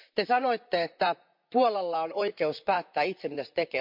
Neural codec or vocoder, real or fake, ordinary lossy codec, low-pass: vocoder, 44.1 kHz, 128 mel bands, Pupu-Vocoder; fake; MP3, 48 kbps; 5.4 kHz